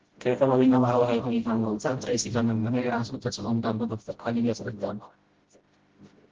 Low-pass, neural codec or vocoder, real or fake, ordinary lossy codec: 7.2 kHz; codec, 16 kHz, 0.5 kbps, FreqCodec, smaller model; fake; Opus, 16 kbps